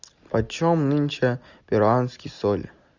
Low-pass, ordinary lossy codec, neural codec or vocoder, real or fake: 7.2 kHz; Opus, 64 kbps; none; real